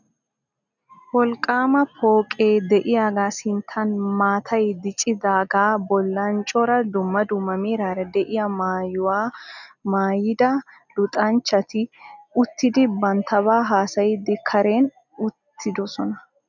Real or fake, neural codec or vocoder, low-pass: real; none; 7.2 kHz